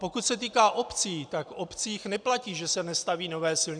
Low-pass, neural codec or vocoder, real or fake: 9.9 kHz; none; real